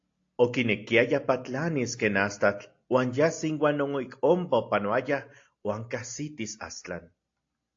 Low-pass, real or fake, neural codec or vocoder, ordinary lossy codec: 7.2 kHz; real; none; AAC, 48 kbps